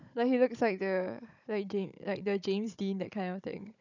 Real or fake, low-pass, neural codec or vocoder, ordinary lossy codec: fake; 7.2 kHz; codec, 16 kHz, 16 kbps, FunCodec, trained on Chinese and English, 50 frames a second; none